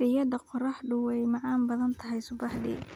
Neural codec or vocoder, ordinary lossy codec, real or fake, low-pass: none; none; real; 19.8 kHz